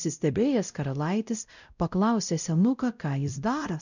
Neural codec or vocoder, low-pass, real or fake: codec, 16 kHz, 0.5 kbps, X-Codec, WavLM features, trained on Multilingual LibriSpeech; 7.2 kHz; fake